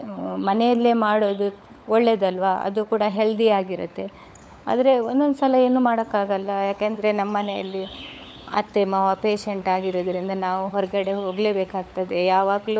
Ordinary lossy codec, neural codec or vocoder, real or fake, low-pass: none; codec, 16 kHz, 16 kbps, FunCodec, trained on LibriTTS, 50 frames a second; fake; none